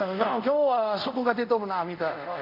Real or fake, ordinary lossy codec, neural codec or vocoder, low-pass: fake; none; codec, 24 kHz, 0.5 kbps, DualCodec; 5.4 kHz